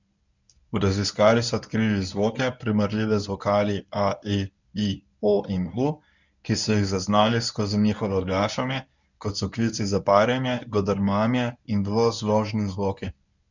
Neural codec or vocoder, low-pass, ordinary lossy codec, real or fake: codec, 24 kHz, 0.9 kbps, WavTokenizer, medium speech release version 1; 7.2 kHz; none; fake